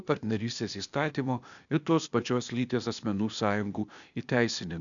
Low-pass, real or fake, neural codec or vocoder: 7.2 kHz; fake; codec, 16 kHz, 0.8 kbps, ZipCodec